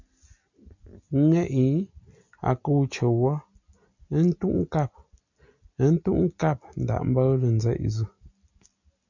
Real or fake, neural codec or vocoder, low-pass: real; none; 7.2 kHz